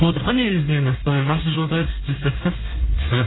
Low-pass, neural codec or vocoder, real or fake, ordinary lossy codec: 7.2 kHz; codec, 24 kHz, 1 kbps, SNAC; fake; AAC, 16 kbps